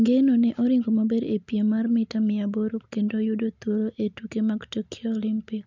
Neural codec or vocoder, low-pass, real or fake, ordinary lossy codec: none; 7.2 kHz; real; none